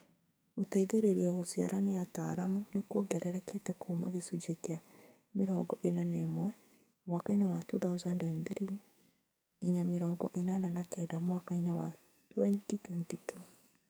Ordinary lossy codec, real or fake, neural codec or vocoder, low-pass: none; fake; codec, 44.1 kHz, 2.6 kbps, SNAC; none